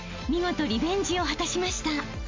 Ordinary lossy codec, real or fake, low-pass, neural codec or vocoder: MP3, 64 kbps; real; 7.2 kHz; none